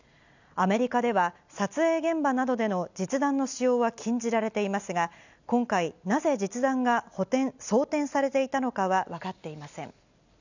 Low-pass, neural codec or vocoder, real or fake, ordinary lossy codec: 7.2 kHz; none; real; none